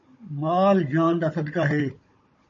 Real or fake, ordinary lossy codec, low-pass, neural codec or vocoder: fake; MP3, 32 kbps; 7.2 kHz; codec, 16 kHz, 16 kbps, FunCodec, trained on Chinese and English, 50 frames a second